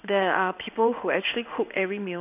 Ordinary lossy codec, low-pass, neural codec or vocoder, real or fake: none; 3.6 kHz; codec, 16 kHz in and 24 kHz out, 1 kbps, XY-Tokenizer; fake